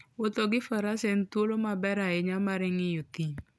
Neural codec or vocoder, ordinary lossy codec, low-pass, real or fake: none; none; none; real